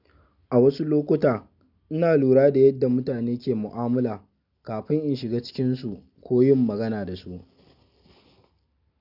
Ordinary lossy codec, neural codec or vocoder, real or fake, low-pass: none; none; real; 5.4 kHz